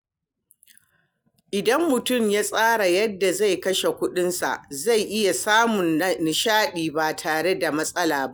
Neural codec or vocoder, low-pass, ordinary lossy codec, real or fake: none; none; none; real